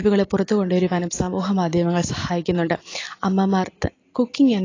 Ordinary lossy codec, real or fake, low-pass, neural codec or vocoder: AAC, 32 kbps; real; 7.2 kHz; none